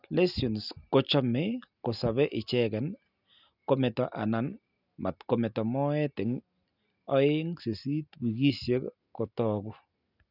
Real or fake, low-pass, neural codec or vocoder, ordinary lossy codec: real; 5.4 kHz; none; none